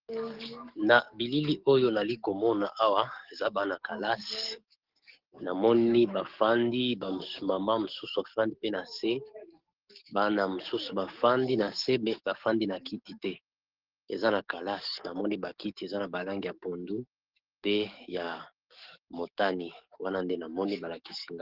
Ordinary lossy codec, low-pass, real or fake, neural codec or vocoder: Opus, 16 kbps; 5.4 kHz; fake; codec, 44.1 kHz, 7.8 kbps, DAC